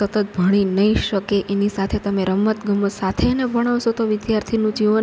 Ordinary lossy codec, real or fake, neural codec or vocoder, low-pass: none; real; none; none